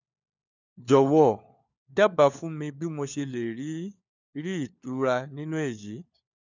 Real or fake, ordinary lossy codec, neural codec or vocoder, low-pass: fake; none; codec, 16 kHz, 4 kbps, FunCodec, trained on LibriTTS, 50 frames a second; 7.2 kHz